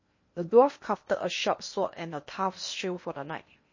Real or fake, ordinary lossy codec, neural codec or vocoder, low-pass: fake; MP3, 32 kbps; codec, 16 kHz in and 24 kHz out, 0.8 kbps, FocalCodec, streaming, 65536 codes; 7.2 kHz